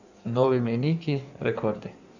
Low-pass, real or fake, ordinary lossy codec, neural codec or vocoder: 7.2 kHz; fake; none; codec, 16 kHz in and 24 kHz out, 1.1 kbps, FireRedTTS-2 codec